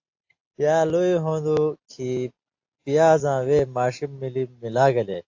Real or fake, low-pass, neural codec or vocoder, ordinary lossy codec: real; 7.2 kHz; none; AAC, 48 kbps